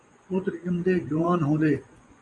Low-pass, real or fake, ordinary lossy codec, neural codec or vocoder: 10.8 kHz; fake; MP3, 48 kbps; vocoder, 24 kHz, 100 mel bands, Vocos